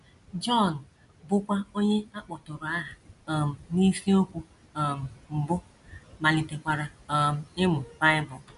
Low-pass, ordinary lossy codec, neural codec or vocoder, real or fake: 10.8 kHz; none; none; real